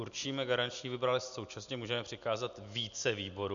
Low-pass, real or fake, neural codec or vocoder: 7.2 kHz; real; none